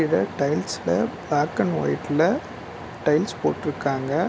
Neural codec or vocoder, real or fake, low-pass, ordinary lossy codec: none; real; none; none